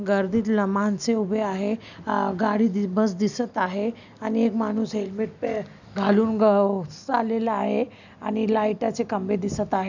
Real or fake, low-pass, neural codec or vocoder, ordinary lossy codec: real; 7.2 kHz; none; none